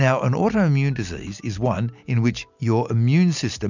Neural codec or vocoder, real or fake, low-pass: none; real; 7.2 kHz